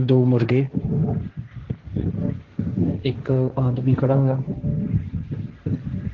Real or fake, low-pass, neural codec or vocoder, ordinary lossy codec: fake; 7.2 kHz; codec, 16 kHz, 1.1 kbps, Voila-Tokenizer; Opus, 16 kbps